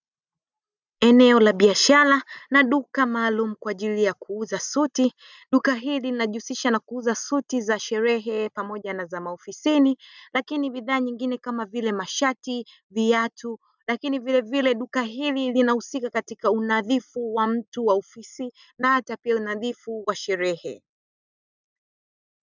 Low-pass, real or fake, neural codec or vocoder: 7.2 kHz; real; none